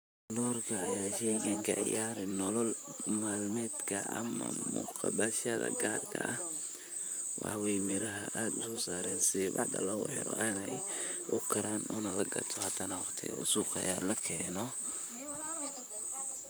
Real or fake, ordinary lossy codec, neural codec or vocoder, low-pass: fake; none; vocoder, 44.1 kHz, 128 mel bands, Pupu-Vocoder; none